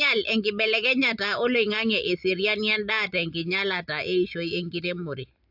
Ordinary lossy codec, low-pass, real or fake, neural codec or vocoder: AAC, 48 kbps; 5.4 kHz; real; none